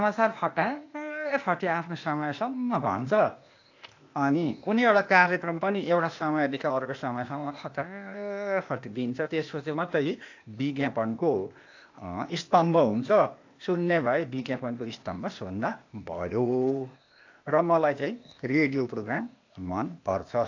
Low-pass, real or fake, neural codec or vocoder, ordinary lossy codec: 7.2 kHz; fake; codec, 16 kHz, 0.8 kbps, ZipCodec; AAC, 48 kbps